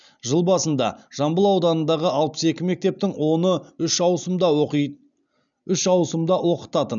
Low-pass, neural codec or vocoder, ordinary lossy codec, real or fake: 7.2 kHz; none; none; real